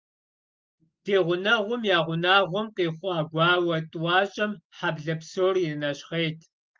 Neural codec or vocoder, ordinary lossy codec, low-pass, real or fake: none; Opus, 32 kbps; 7.2 kHz; real